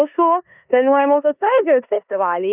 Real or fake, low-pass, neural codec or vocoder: fake; 3.6 kHz; codec, 16 kHz in and 24 kHz out, 0.9 kbps, LongCat-Audio-Codec, four codebook decoder